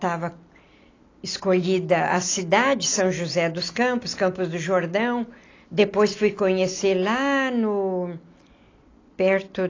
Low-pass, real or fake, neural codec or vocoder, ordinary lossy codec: 7.2 kHz; real; none; AAC, 32 kbps